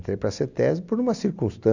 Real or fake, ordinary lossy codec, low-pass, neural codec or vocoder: real; none; 7.2 kHz; none